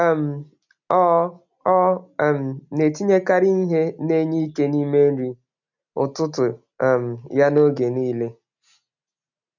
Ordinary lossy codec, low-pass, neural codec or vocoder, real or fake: none; 7.2 kHz; none; real